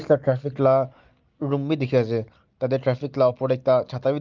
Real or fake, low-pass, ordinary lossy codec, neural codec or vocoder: real; 7.2 kHz; Opus, 32 kbps; none